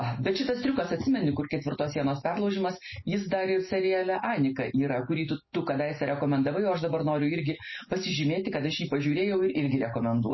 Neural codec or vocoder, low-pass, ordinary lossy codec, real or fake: none; 7.2 kHz; MP3, 24 kbps; real